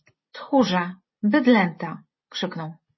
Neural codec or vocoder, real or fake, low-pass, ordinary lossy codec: none; real; 7.2 kHz; MP3, 24 kbps